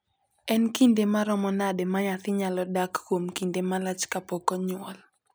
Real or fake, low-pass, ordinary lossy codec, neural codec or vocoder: real; none; none; none